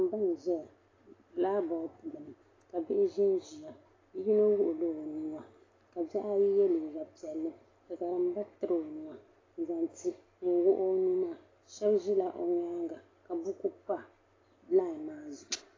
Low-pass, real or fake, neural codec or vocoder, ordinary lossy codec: 7.2 kHz; real; none; AAC, 32 kbps